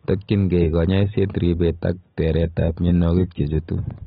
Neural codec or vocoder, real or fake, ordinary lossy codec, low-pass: none; real; AAC, 16 kbps; 7.2 kHz